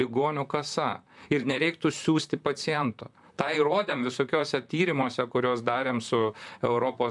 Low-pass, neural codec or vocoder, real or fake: 10.8 kHz; vocoder, 44.1 kHz, 128 mel bands, Pupu-Vocoder; fake